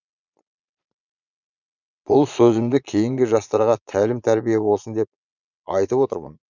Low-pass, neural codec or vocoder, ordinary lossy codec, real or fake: 7.2 kHz; vocoder, 22.05 kHz, 80 mel bands, Vocos; none; fake